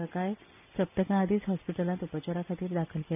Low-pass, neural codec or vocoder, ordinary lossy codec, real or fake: 3.6 kHz; none; none; real